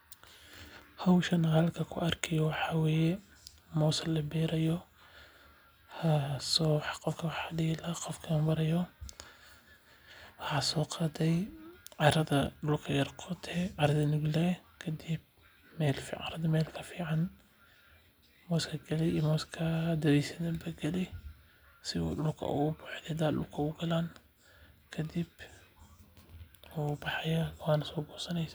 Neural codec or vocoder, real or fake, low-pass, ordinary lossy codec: none; real; none; none